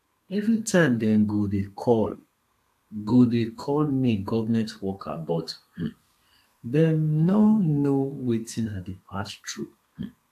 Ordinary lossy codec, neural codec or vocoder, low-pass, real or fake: AAC, 64 kbps; codec, 32 kHz, 1.9 kbps, SNAC; 14.4 kHz; fake